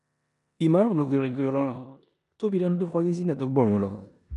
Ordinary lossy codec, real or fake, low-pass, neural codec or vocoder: none; fake; 10.8 kHz; codec, 16 kHz in and 24 kHz out, 0.9 kbps, LongCat-Audio-Codec, four codebook decoder